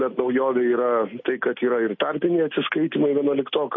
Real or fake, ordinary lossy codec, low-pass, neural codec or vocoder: real; MP3, 32 kbps; 7.2 kHz; none